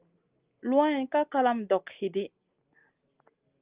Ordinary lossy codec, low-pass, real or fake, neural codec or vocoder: Opus, 32 kbps; 3.6 kHz; real; none